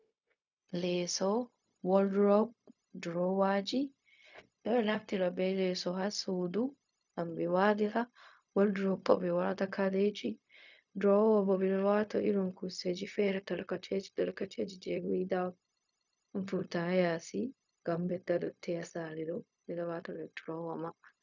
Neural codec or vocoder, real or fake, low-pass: codec, 16 kHz, 0.4 kbps, LongCat-Audio-Codec; fake; 7.2 kHz